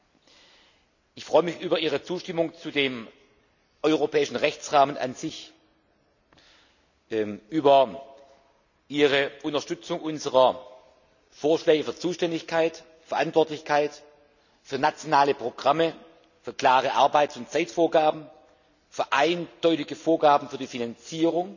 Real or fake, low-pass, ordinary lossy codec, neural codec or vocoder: real; 7.2 kHz; none; none